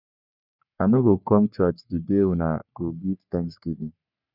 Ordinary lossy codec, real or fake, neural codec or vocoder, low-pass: none; fake; codec, 44.1 kHz, 3.4 kbps, Pupu-Codec; 5.4 kHz